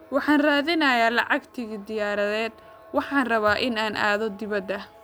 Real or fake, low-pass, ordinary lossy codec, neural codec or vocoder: real; none; none; none